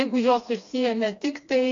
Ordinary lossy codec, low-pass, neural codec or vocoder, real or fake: AAC, 48 kbps; 7.2 kHz; codec, 16 kHz, 2 kbps, FreqCodec, smaller model; fake